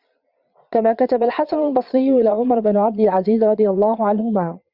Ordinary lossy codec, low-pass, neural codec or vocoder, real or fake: Opus, 64 kbps; 5.4 kHz; vocoder, 22.05 kHz, 80 mel bands, Vocos; fake